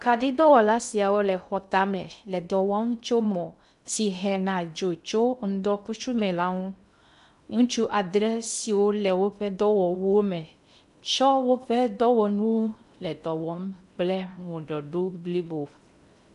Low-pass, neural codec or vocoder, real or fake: 10.8 kHz; codec, 16 kHz in and 24 kHz out, 0.6 kbps, FocalCodec, streaming, 2048 codes; fake